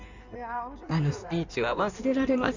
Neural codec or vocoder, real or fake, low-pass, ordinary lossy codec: codec, 16 kHz in and 24 kHz out, 1.1 kbps, FireRedTTS-2 codec; fake; 7.2 kHz; none